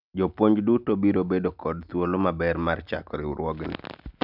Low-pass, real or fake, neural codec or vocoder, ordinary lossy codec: 5.4 kHz; real; none; none